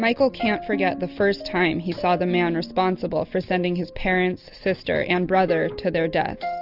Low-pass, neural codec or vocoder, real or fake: 5.4 kHz; none; real